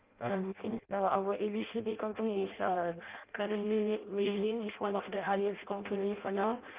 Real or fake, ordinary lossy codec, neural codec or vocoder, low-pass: fake; Opus, 32 kbps; codec, 16 kHz in and 24 kHz out, 0.6 kbps, FireRedTTS-2 codec; 3.6 kHz